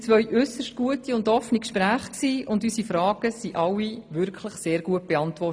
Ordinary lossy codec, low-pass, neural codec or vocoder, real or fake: none; none; none; real